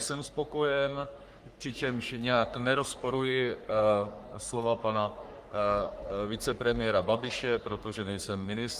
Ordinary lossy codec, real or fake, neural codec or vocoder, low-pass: Opus, 32 kbps; fake; codec, 44.1 kHz, 3.4 kbps, Pupu-Codec; 14.4 kHz